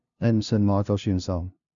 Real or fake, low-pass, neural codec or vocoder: fake; 7.2 kHz; codec, 16 kHz, 0.5 kbps, FunCodec, trained on LibriTTS, 25 frames a second